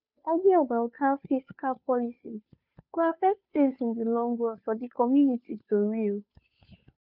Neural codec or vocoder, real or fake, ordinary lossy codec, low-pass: codec, 16 kHz, 2 kbps, FunCodec, trained on Chinese and English, 25 frames a second; fake; none; 5.4 kHz